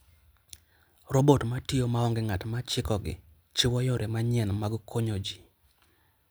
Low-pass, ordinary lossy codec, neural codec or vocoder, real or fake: none; none; none; real